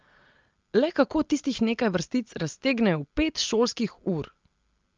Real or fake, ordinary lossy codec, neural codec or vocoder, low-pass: real; Opus, 24 kbps; none; 7.2 kHz